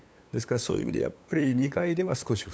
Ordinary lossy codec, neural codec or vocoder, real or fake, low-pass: none; codec, 16 kHz, 8 kbps, FunCodec, trained on LibriTTS, 25 frames a second; fake; none